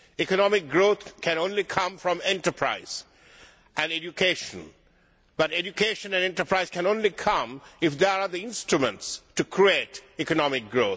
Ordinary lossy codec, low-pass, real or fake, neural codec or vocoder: none; none; real; none